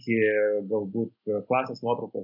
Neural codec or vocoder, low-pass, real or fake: none; 5.4 kHz; real